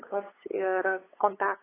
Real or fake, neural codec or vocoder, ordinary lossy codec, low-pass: fake; codec, 16 kHz, 8 kbps, FunCodec, trained on LibriTTS, 25 frames a second; AAC, 16 kbps; 3.6 kHz